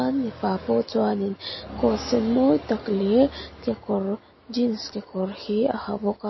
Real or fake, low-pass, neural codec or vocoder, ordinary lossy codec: real; 7.2 kHz; none; MP3, 24 kbps